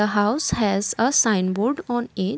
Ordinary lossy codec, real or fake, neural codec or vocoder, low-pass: none; real; none; none